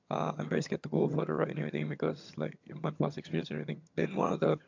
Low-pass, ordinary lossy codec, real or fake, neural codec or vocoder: 7.2 kHz; MP3, 64 kbps; fake; vocoder, 22.05 kHz, 80 mel bands, HiFi-GAN